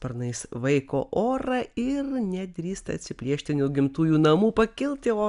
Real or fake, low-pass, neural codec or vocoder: real; 10.8 kHz; none